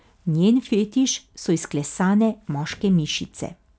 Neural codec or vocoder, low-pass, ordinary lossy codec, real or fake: none; none; none; real